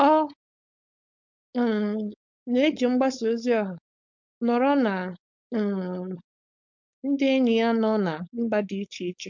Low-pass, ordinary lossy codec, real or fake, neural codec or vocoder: 7.2 kHz; MP3, 64 kbps; fake; codec, 16 kHz, 4.8 kbps, FACodec